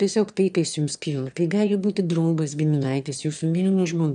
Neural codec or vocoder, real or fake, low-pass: autoencoder, 22.05 kHz, a latent of 192 numbers a frame, VITS, trained on one speaker; fake; 9.9 kHz